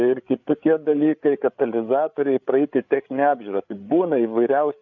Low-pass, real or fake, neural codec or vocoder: 7.2 kHz; fake; codec, 16 kHz, 8 kbps, FreqCodec, larger model